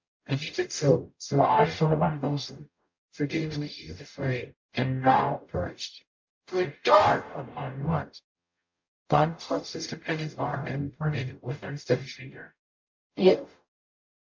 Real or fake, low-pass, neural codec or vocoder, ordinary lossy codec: fake; 7.2 kHz; codec, 44.1 kHz, 0.9 kbps, DAC; MP3, 48 kbps